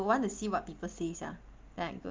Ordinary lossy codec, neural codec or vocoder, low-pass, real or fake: Opus, 24 kbps; none; 7.2 kHz; real